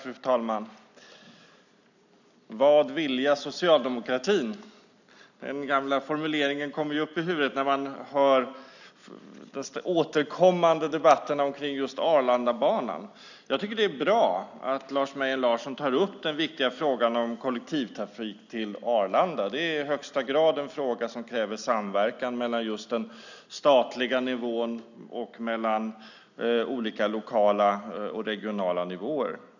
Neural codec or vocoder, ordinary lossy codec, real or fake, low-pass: none; none; real; 7.2 kHz